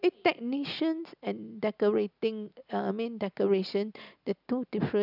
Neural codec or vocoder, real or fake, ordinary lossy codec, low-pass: none; real; none; 5.4 kHz